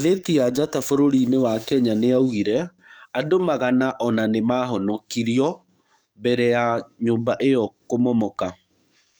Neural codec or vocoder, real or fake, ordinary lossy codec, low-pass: codec, 44.1 kHz, 7.8 kbps, DAC; fake; none; none